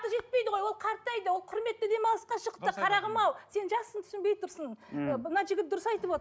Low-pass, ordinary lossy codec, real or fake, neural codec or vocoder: none; none; real; none